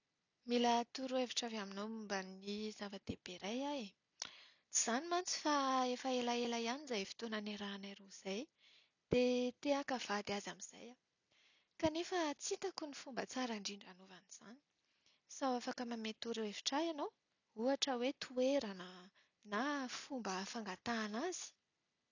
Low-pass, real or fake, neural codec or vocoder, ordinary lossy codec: 7.2 kHz; real; none; none